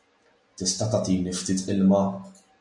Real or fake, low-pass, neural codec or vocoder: real; 10.8 kHz; none